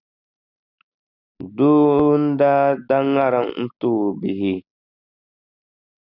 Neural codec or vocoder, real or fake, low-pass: none; real; 5.4 kHz